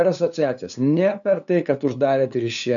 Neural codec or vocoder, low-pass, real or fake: codec, 16 kHz, 2 kbps, FunCodec, trained on LibriTTS, 25 frames a second; 7.2 kHz; fake